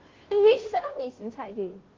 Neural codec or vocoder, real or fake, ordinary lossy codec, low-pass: codec, 16 kHz, 0.5 kbps, FunCodec, trained on Chinese and English, 25 frames a second; fake; Opus, 16 kbps; 7.2 kHz